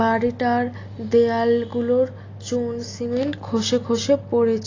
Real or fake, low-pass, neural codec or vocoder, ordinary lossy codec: real; 7.2 kHz; none; AAC, 32 kbps